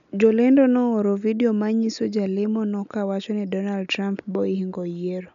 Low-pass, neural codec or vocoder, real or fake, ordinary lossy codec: 7.2 kHz; none; real; none